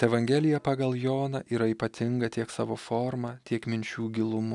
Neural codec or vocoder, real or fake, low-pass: none; real; 10.8 kHz